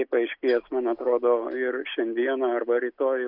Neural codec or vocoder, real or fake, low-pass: none; real; 5.4 kHz